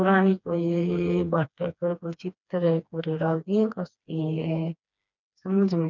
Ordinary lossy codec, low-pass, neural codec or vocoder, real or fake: none; 7.2 kHz; codec, 16 kHz, 2 kbps, FreqCodec, smaller model; fake